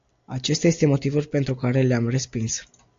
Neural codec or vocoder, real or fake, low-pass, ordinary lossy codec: none; real; 7.2 kHz; AAC, 48 kbps